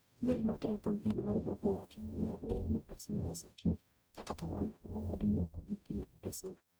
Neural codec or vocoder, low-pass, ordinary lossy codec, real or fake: codec, 44.1 kHz, 0.9 kbps, DAC; none; none; fake